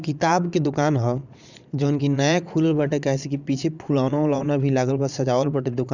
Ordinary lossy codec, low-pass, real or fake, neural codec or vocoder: none; 7.2 kHz; fake; vocoder, 22.05 kHz, 80 mel bands, Vocos